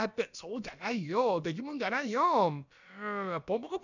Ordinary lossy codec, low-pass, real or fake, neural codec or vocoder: none; 7.2 kHz; fake; codec, 16 kHz, about 1 kbps, DyCAST, with the encoder's durations